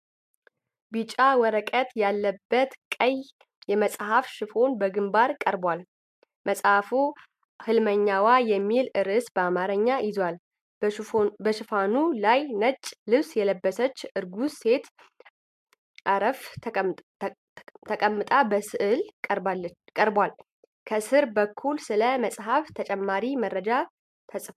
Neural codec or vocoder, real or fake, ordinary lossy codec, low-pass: none; real; MP3, 96 kbps; 14.4 kHz